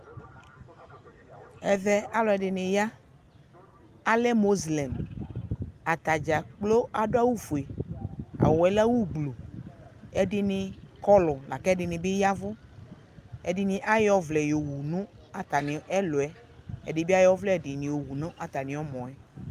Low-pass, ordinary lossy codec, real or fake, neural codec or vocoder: 14.4 kHz; Opus, 32 kbps; real; none